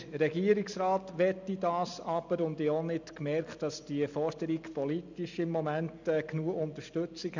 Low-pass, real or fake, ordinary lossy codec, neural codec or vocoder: 7.2 kHz; real; none; none